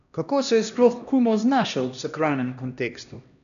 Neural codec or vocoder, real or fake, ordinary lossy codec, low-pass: codec, 16 kHz, 1 kbps, X-Codec, HuBERT features, trained on LibriSpeech; fake; none; 7.2 kHz